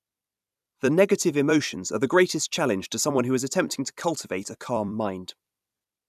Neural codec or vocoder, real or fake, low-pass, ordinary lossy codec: vocoder, 44.1 kHz, 128 mel bands every 256 samples, BigVGAN v2; fake; 14.4 kHz; none